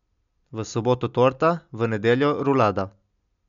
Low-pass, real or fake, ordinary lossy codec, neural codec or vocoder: 7.2 kHz; real; none; none